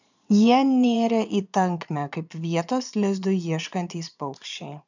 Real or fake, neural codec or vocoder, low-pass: fake; vocoder, 22.05 kHz, 80 mel bands, Vocos; 7.2 kHz